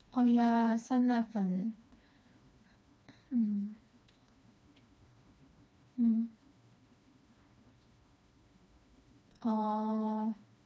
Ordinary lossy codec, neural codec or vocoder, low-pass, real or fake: none; codec, 16 kHz, 2 kbps, FreqCodec, smaller model; none; fake